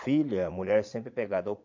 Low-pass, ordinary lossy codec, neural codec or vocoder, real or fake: 7.2 kHz; MP3, 64 kbps; vocoder, 22.05 kHz, 80 mel bands, Vocos; fake